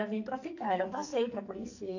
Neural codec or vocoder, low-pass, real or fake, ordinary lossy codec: codec, 44.1 kHz, 2.6 kbps, SNAC; 7.2 kHz; fake; none